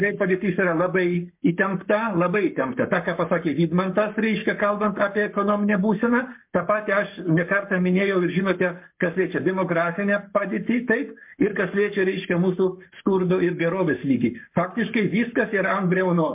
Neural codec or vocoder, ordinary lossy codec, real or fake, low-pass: codec, 44.1 kHz, 7.8 kbps, Pupu-Codec; MP3, 32 kbps; fake; 3.6 kHz